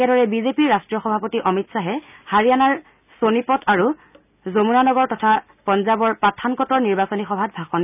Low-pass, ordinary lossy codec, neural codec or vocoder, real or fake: 3.6 kHz; none; none; real